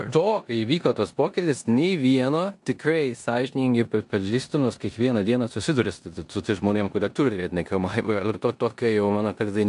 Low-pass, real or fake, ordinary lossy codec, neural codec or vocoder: 10.8 kHz; fake; AAC, 64 kbps; codec, 16 kHz in and 24 kHz out, 0.9 kbps, LongCat-Audio-Codec, four codebook decoder